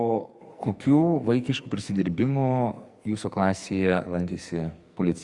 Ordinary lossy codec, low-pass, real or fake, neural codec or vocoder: Opus, 64 kbps; 10.8 kHz; fake; codec, 44.1 kHz, 2.6 kbps, SNAC